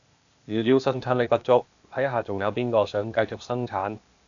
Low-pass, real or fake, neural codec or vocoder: 7.2 kHz; fake; codec, 16 kHz, 0.8 kbps, ZipCodec